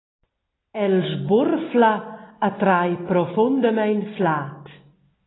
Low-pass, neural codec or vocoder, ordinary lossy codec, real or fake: 7.2 kHz; none; AAC, 16 kbps; real